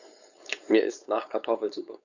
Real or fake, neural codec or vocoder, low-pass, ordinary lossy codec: fake; codec, 16 kHz, 4.8 kbps, FACodec; 7.2 kHz; none